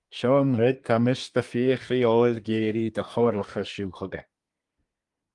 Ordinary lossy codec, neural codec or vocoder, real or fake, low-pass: Opus, 32 kbps; codec, 24 kHz, 1 kbps, SNAC; fake; 10.8 kHz